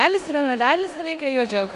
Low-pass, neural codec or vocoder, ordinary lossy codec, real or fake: 10.8 kHz; codec, 16 kHz in and 24 kHz out, 0.9 kbps, LongCat-Audio-Codec, four codebook decoder; Opus, 64 kbps; fake